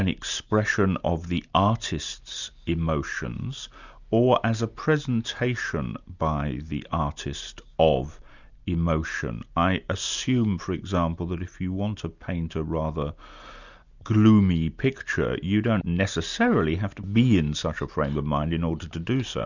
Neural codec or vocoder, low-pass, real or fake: none; 7.2 kHz; real